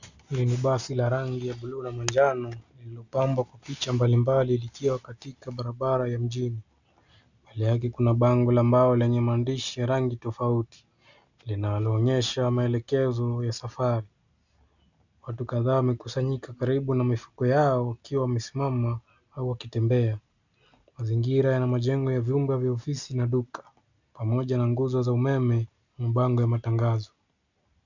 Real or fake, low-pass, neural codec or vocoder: real; 7.2 kHz; none